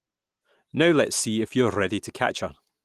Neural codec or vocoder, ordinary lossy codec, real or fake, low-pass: none; Opus, 24 kbps; real; 14.4 kHz